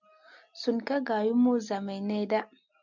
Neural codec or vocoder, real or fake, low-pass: none; real; 7.2 kHz